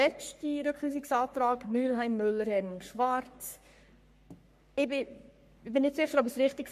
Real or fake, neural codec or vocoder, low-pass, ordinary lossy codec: fake; codec, 44.1 kHz, 3.4 kbps, Pupu-Codec; 14.4 kHz; MP3, 64 kbps